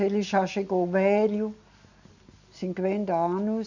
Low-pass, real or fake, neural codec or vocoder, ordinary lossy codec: 7.2 kHz; real; none; none